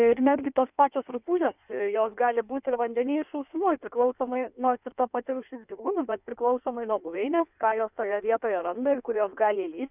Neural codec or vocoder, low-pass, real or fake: codec, 16 kHz in and 24 kHz out, 1.1 kbps, FireRedTTS-2 codec; 3.6 kHz; fake